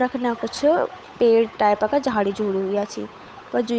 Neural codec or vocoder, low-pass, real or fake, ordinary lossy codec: codec, 16 kHz, 8 kbps, FunCodec, trained on Chinese and English, 25 frames a second; none; fake; none